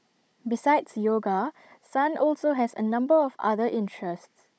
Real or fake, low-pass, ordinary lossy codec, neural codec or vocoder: fake; none; none; codec, 16 kHz, 16 kbps, FunCodec, trained on Chinese and English, 50 frames a second